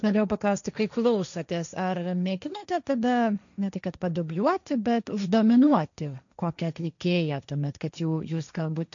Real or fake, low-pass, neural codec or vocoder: fake; 7.2 kHz; codec, 16 kHz, 1.1 kbps, Voila-Tokenizer